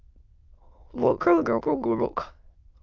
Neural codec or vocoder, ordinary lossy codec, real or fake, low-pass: autoencoder, 22.05 kHz, a latent of 192 numbers a frame, VITS, trained on many speakers; Opus, 32 kbps; fake; 7.2 kHz